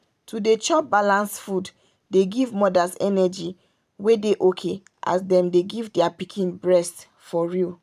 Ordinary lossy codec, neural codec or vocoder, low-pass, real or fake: none; vocoder, 44.1 kHz, 128 mel bands every 512 samples, BigVGAN v2; 14.4 kHz; fake